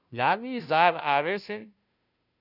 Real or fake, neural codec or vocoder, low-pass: fake; codec, 16 kHz, 0.5 kbps, FunCodec, trained on Chinese and English, 25 frames a second; 5.4 kHz